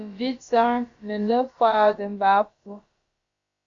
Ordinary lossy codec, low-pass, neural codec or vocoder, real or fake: Opus, 64 kbps; 7.2 kHz; codec, 16 kHz, about 1 kbps, DyCAST, with the encoder's durations; fake